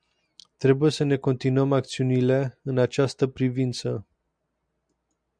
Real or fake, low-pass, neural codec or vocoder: real; 9.9 kHz; none